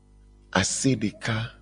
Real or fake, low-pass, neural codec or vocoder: real; 9.9 kHz; none